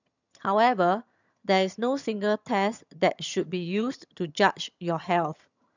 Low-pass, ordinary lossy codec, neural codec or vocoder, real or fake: 7.2 kHz; none; vocoder, 22.05 kHz, 80 mel bands, HiFi-GAN; fake